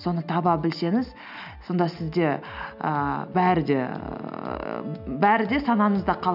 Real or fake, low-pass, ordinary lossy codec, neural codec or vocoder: real; 5.4 kHz; none; none